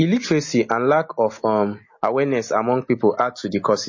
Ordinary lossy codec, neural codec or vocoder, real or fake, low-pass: MP3, 32 kbps; none; real; 7.2 kHz